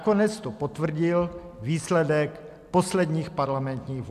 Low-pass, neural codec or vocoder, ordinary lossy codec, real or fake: 14.4 kHz; none; AAC, 96 kbps; real